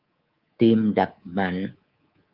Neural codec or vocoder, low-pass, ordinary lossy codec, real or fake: codec, 24 kHz, 3.1 kbps, DualCodec; 5.4 kHz; Opus, 32 kbps; fake